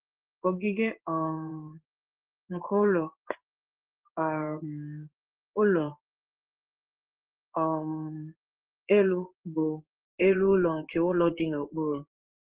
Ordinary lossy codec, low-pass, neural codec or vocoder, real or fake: Opus, 16 kbps; 3.6 kHz; codec, 16 kHz in and 24 kHz out, 1 kbps, XY-Tokenizer; fake